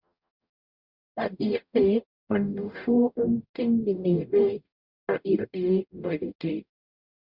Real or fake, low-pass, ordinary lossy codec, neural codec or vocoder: fake; 5.4 kHz; Opus, 64 kbps; codec, 44.1 kHz, 0.9 kbps, DAC